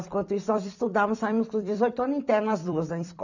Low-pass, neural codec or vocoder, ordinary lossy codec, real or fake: 7.2 kHz; vocoder, 44.1 kHz, 128 mel bands every 256 samples, BigVGAN v2; none; fake